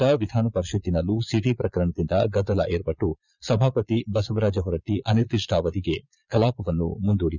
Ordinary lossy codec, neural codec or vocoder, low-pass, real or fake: none; vocoder, 22.05 kHz, 80 mel bands, Vocos; 7.2 kHz; fake